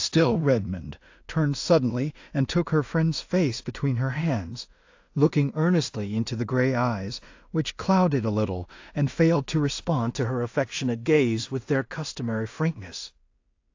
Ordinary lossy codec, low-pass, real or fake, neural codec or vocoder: AAC, 48 kbps; 7.2 kHz; fake; codec, 16 kHz in and 24 kHz out, 0.4 kbps, LongCat-Audio-Codec, two codebook decoder